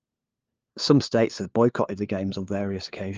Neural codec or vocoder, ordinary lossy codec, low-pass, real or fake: codec, 16 kHz, 4 kbps, FunCodec, trained on LibriTTS, 50 frames a second; Opus, 24 kbps; 7.2 kHz; fake